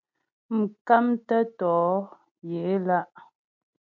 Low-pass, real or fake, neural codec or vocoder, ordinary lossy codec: 7.2 kHz; real; none; MP3, 48 kbps